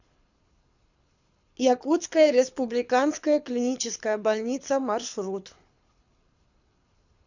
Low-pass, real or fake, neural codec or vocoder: 7.2 kHz; fake; codec, 24 kHz, 6 kbps, HILCodec